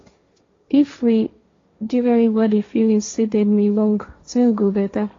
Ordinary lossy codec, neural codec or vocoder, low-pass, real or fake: AAC, 32 kbps; codec, 16 kHz, 1.1 kbps, Voila-Tokenizer; 7.2 kHz; fake